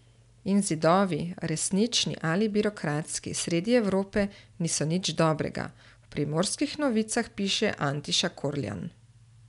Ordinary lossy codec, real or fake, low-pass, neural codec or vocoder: none; real; 10.8 kHz; none